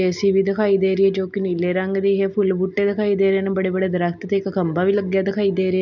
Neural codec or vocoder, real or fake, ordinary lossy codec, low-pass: none; real; none; 7.2 kHz